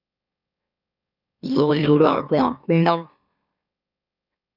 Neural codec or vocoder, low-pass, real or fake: autoencoder, 44.1 kHz, a latent of 192 numbers a frame, MeloTTS; 5.4 kHz; fake